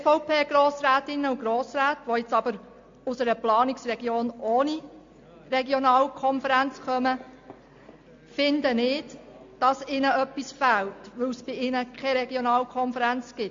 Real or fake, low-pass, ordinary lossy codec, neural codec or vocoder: real; 7.2 kHz; MP3, 48 kbps; none